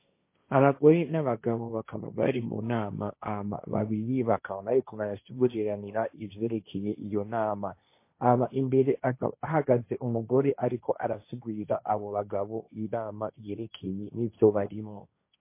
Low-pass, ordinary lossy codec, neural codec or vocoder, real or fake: 3.6 kHz; MP3, 24 kbps; codec, 16 kHz, 1.1 kbps, Voila-Tokenizer; fake